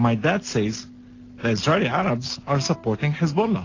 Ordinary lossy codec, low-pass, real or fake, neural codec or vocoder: AAC, 32 kbps; 7.2 kHz; real; none